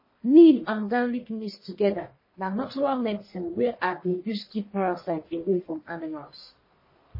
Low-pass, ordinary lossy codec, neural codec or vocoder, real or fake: 5.4 kHz; MP3, 24 kbps; codec, 44.1 kHz, 1.7 kbps, Pupu-Codec; fake